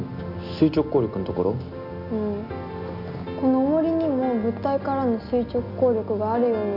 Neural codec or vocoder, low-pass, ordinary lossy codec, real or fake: none; 5.4 kHz; none; real